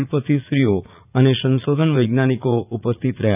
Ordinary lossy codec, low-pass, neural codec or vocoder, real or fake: none; 3.6 kHz; vocoder, 44.1 kHz, 80 mel bands, Vocos; fake